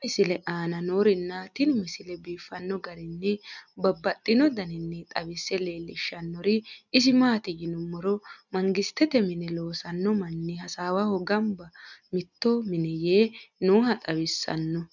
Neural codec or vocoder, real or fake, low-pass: none; real; 7.2 kHz